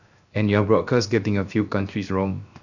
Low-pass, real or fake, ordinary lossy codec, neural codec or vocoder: 7.2 kHz; fake; none; codec, 16 kHz, 0.7 kbps, FocalCodec